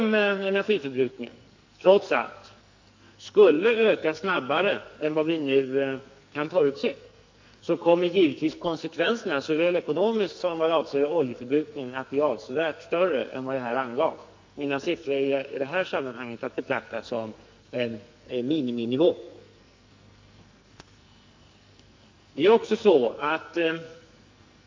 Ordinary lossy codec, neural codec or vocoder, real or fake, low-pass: MP3, 48 kbps; codec, 44.1 kHz, 2.6 kbps, SNAC; fake; 7.2 kHz